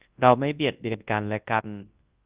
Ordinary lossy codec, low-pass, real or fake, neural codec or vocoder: Opus, 32 kbps; 3.6 kHz; fake; codec, 24 kHz, 0.9 kbps, WavTokenizer, large speech release